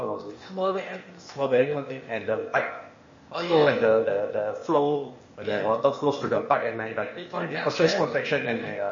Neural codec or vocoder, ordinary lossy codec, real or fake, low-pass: codec, 16 kHz, 0.8 kbps, ZipCodec; MP3, 32 kbps; fake; 7.2 kHz